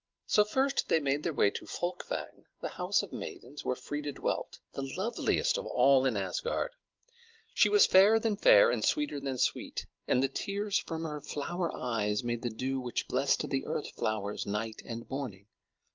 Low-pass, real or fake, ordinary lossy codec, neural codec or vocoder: 7.2 kHz; real; Opus, 24 kbps; none